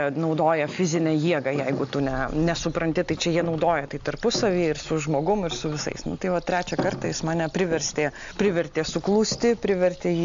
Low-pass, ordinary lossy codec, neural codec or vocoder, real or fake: 7.2 kHz; AAC, 64 kbps; none; real